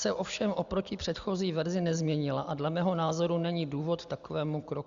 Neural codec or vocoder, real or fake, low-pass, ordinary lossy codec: none; real; 7.2 kHz; Opus, 64 kbps